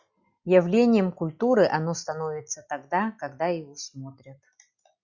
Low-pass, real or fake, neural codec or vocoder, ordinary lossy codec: 7.2 kHz; real; none; Opus, 64 kbps